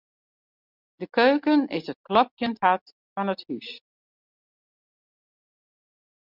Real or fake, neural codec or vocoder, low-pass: real; none; 5.4 kHz